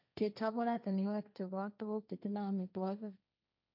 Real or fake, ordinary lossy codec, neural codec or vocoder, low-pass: fake; none; codec, 16 kHz, 1.1 kbps, Voila-Tokenizer; 5.4 kHz